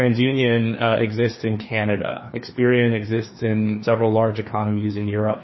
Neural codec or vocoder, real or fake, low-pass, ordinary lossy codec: codec, 16 kHz, 2 kbps, FreqCodec, larger model; fake; 7.2 kHz; MP3, 24 kbps